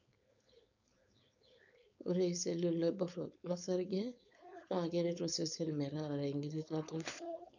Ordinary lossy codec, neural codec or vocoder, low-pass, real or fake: none; codec, 16 kHz, 4.8 kbps, FACodec; 7.2 kHz; fake